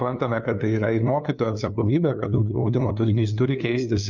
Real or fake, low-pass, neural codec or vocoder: fake; 7.2 kHz; codec, 16 kHz, 2 kbps, FunCodec, trained on LibriTTS, 25 frames a second